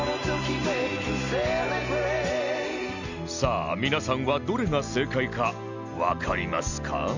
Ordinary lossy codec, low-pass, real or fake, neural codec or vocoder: none; 7.2 kHz; real; none